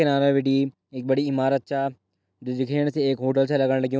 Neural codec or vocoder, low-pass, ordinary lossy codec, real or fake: none; none; none; real